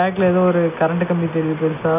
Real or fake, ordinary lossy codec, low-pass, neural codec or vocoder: real; none; 3.6 kHz; none